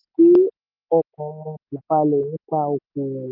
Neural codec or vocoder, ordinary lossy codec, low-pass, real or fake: none; none; 5.4 kHz; real